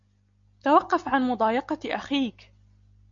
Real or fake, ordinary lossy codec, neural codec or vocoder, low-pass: real; MP3, 64 kbps; none; 7.2 kHz